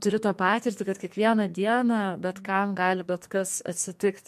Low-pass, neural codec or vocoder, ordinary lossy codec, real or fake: 14.4 kHz; codec, 44.1 kHz, 2.6 kbps, SNAC; MP3, 64 kbps; fake